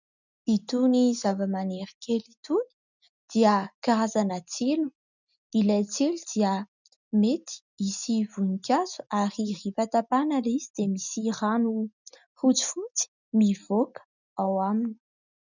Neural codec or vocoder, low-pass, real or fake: none; 7.2 kHz; real